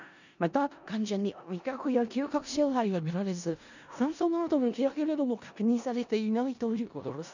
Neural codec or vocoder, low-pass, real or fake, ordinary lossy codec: codec, 16 kHz in and 24 kHz out, 0.4 kbps, LongCat-Audio-Codec, four codebook decoder; 7.2 kHz; fake; none